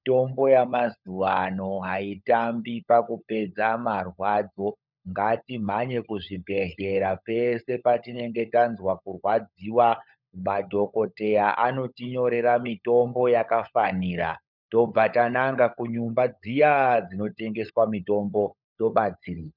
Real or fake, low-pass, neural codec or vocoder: fake; 5.4 kHz; codec, 16 kHz, 16 kbps, FunCodec, trained on LibriTTS, 50 frames a second